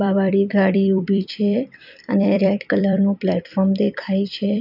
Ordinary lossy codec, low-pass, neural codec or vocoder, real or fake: none; 5.4 kHz; vocoder, 44.1 kHz, 128 mel bands every 256 samples, BigVGAN v2; fake